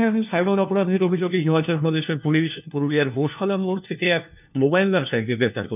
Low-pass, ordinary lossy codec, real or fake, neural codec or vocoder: 3.6 kHz; none; fake; codec, 16 kHz, 1 kbps, FunCodec, trained on LibriTTS, 50 frames a second